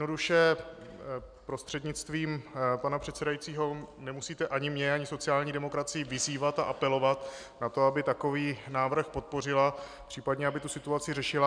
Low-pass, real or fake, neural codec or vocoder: 9.9 kHz; real; none